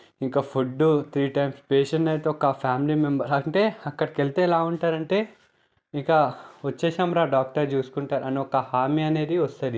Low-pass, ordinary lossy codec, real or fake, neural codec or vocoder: none; none; real; none